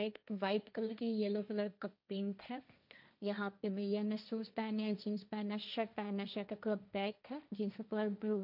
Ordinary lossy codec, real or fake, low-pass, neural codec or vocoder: none; fake; 5.4 kHz; codec, 16 kHz, 1.1 kbps, Voila-Tokenizer